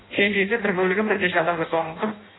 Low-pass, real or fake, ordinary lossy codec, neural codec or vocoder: 7.2 kHz; fake; AAC, 16 kbps; codec, 16 kHz in and 24 kHz out, 0.6 kbps, FireRedTTS-2 codec